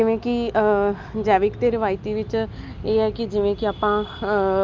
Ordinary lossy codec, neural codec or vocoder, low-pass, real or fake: Opus, 24 kbps; none; 7.2 kHz; real